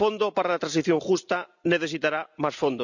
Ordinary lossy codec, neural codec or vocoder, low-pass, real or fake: none; none; 7.2 kHz; real